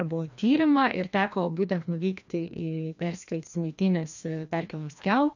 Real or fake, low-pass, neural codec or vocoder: fake; 7.2 kHz; codec, 16 kHz, 1 kbps, FreqCodec, larger model